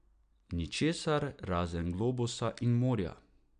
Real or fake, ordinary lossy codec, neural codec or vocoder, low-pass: real; none; none; 9.9 kHz